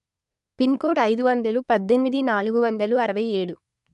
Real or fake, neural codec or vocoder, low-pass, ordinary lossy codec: fake; codec, 24 kHz, 1 kbps, SNAC; 10.8 kHz; none